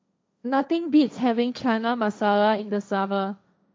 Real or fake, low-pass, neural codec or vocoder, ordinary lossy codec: fake; none; codec, 16 kHz, 1.1 kbps, Voila-Tokenizer; none